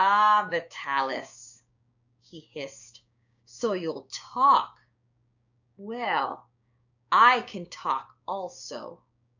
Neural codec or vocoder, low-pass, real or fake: codec, 16 kHz, 6 kbps, DAC; 7.2 kHz; fake